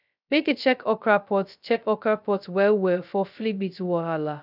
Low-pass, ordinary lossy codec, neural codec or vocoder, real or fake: 5.4 kHz; none; codec, 16 kHz, 0.2 kbps, FocalCodec; fake